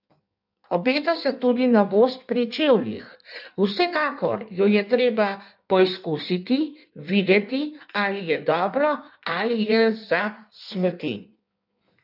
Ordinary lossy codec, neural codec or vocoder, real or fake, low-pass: none; codec, 16 kHz in and 24 kHz out, 1.1 kbps, FireRedTTS-2 codec; fake; 5.4 kHz